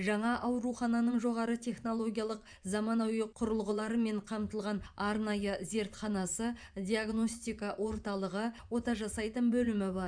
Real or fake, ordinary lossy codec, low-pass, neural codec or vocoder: fake; none; 9.9 kHz; vocoder, 24 kHz, 100 mel bands, Vocos